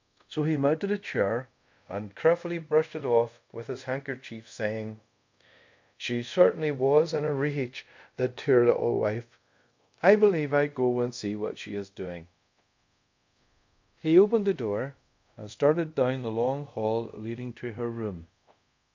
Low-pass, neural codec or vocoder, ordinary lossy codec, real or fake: 7.2 kHz; codec, 24 kHz, 0.5 kbps, DualCodec; MP3, 64 kbps; fake